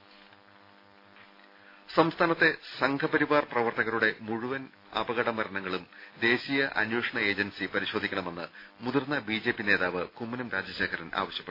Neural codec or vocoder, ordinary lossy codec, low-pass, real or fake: none; AAC, 32 kbps; 5.4 kHz; real